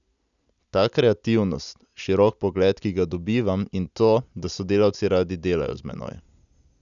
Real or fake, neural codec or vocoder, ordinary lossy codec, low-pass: real; none; none; 7.2 kHz